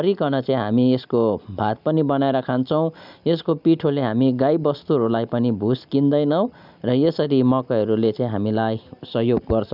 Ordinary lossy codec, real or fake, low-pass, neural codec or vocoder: none; real; 5.4 kHz; none